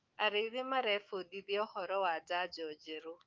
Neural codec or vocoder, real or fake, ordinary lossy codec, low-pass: autoencoder, 48 kHz, 128 numbers a frame, DAC-VAE, trained on Japanese speech; fake; Opus, 24 kbps; 7.2 kHz